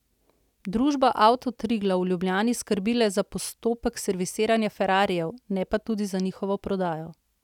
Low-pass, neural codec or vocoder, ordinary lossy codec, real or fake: 19.8 kHz; none; none; real